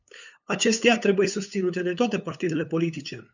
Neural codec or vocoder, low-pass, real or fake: codec, 16 kHz, 8 kbps, FunCodec, trained on LibriTTS, 25 frames a second; 7.2 kHz; fake